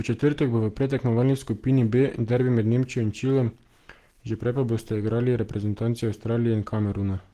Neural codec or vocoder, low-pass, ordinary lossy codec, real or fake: none; 14.4 kHz; Opus, 16 kbps; real